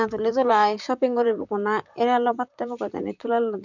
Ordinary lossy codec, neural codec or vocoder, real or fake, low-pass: none; vocoder, 44.1 kHz, 128 mel bands, Pupu-Vocoder; fake; 7.2 kHz